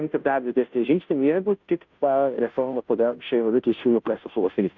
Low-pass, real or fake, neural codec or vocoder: 7.2 kHz; fake; codec, 16 kHz, 0.5 kbps, FunCodec, trained on Chinese and English, 25 frames a second